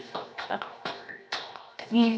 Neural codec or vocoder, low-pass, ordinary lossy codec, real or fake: codec, 16 kHz, 0.7 kbps, FocalCodec; none; none; fake